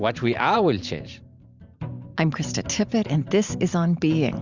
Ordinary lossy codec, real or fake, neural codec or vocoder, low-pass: Opus, 64 kbps; fake; vocoder, 44.1 kHz, 128 mel bands every 512 samples, BigVGAN v2; 7.2 kHz